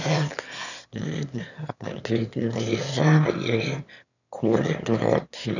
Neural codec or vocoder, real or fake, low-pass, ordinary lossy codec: autoencoder, 22.05 kHz, a latent of 192 numbers a frame, VITS, trained on one speaker; fake; 7.2 kHz; none